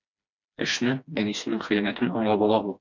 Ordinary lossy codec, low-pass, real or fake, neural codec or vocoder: MP3, 64 kbps; 7.2 kHz; fake; codec, 16 kHz, 2 kbps, FreqCodec, smaller model